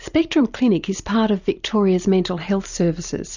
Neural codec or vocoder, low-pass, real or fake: vocoder, 44.1 kHz, 128 mel bands every 256 samples, BigVGAN v2; 7.2 kHz; fake